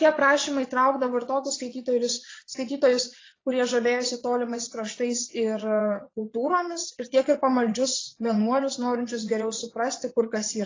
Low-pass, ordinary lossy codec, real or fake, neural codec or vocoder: 7.2 kHz; AAC, 32 kbps; fake; vocoder, 22.05 kHz, 80 mel bands, Vocos